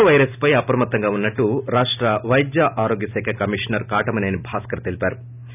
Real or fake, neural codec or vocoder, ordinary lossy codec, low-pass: real; none; none; 3.6 kHz